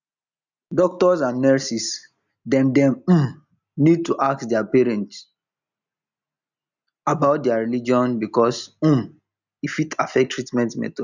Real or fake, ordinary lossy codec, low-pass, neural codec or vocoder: real; none; 7.2 kHz; none